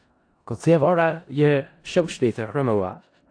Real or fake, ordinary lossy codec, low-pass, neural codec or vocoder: fake; AAC, 48 kbps; 9.9 kHz; codec, 16 kHz in and 24 kHz out, 0.4 kbps, LongCat-Audio-Codec, four codebook decoder